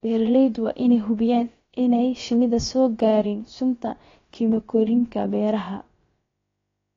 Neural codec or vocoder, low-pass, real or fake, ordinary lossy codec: codec, 16 kHz, about 1 kbps, DyCAST, with the encoder's durations; 7.2 kHz; fake; AAC, 32 kbps